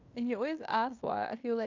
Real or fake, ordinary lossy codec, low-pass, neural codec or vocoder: fake; Opus, 64 kbps; 7.2 kHz; codec, 16 kHz in and 24 kHz out, 0.9 kbps, LongCat-Audio-Codec, fine tuned four codebook decoder